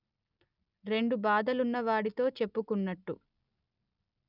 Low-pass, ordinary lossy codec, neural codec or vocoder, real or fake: 5.4 kHz; none; none; real